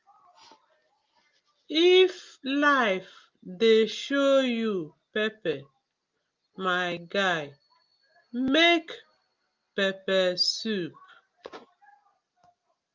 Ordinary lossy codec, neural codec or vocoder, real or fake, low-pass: Opus, 24 kbps; none; real; 7.2 kHz